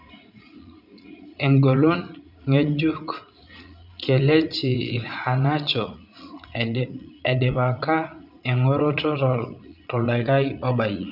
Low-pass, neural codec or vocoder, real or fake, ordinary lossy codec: 5.4 kHz; vocoder, 44.1 kHz, 80 mel bands, Vocos; fake; none